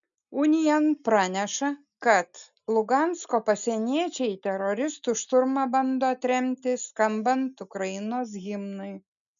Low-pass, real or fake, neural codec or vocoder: 7.2 kHz; real; none